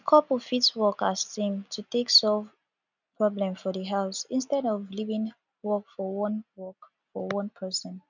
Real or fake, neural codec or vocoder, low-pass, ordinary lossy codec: real; none; 7.2 kHz; none